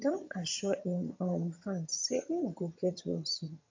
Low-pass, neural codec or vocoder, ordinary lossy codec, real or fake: 7.2 kHz; vocoder, 22.05 kHz, 80 mel bands, HiFi-GAN; MP3, 48 kbps; fake